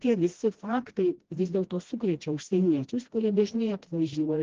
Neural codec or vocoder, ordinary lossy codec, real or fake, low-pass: codec, 16 kHz, 1 kbps, FreqCodec, smaller model; Opus, 16 kbps; fake; 7.2 kHz